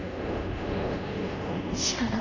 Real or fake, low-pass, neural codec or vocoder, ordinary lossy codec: fake; 7.2 kHz; codec, 24 kHz, 0.5 kbps, DualCodec; none